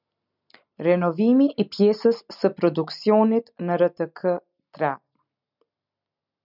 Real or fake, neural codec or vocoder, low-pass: real; none; 5.4 kHz